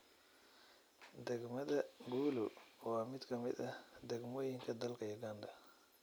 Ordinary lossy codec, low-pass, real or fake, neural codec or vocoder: none; none; real; none